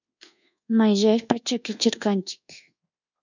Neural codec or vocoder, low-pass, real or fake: codec, 24 kHz, 1.2 kbps, DualCodec; 7.2 kHz; fake